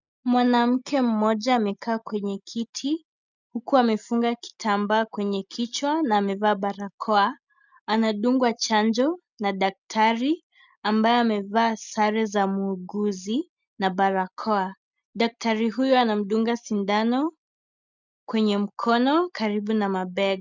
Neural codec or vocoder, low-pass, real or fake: none; 7.2 kHz; real